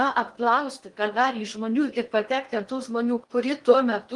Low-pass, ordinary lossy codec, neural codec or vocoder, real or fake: 10.8 kHz; Opus, 24 kbps; codec, 16 kHz in and 24 kHz out, 0.8 kbps, FocalCodec, streaming, 65536 codes; fake